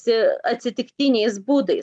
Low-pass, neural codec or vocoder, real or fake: 9.9 kHz; none; real